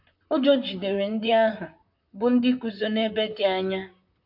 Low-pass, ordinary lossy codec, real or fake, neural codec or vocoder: 5.4 kHz; none; fake; vocoder, 44.1 kHz, 128 mel bands, Pupu-Vocoder